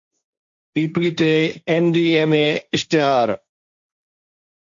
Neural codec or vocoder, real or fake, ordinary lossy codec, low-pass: codec, 16 kHz, 1.1 kbps, Voila-Tokenizer; fake; MP3, 48 kbps; 7.2 kHz